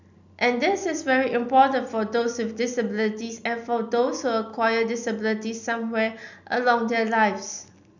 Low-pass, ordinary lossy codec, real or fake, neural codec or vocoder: 7.2 kHz; none; real; none